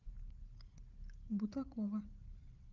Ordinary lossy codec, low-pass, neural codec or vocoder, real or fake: Opus, 32 kbps; 7.2 kHz; codec, 16 kHz, 8 kbps, FreqCodec, larger model; fake